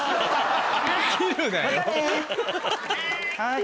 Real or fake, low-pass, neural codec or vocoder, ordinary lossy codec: real; none; none; none